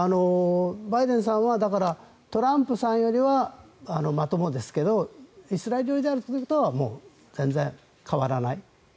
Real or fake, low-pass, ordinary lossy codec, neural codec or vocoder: real; none; none; none